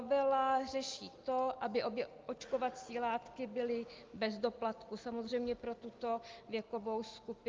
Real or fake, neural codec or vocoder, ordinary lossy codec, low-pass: real; none; Opus, 24 kbps; 7.2 kHz